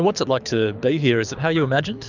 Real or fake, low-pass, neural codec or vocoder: fake; 7.2 kHz; codec, 24 kHz, 6 kbps, HILCodec